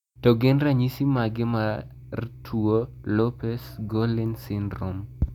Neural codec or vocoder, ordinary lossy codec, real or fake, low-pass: none; none; real; 19.8 kHz